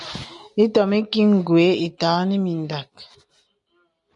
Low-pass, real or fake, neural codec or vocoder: 10.8 kHz; real; none